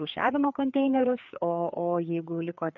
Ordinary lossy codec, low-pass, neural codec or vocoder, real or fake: MP3, 64 kbps; 7.2 kHz; codec, 16 kHz, 4 kbps, FreqCodec, larger model; fake